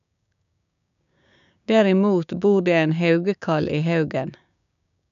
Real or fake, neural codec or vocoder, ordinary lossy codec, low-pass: fake; codec, 16 kHz, 6 kbps, DAC; none; 7.2 kHz